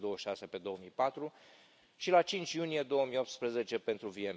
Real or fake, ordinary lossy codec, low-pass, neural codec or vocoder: real; none; none; none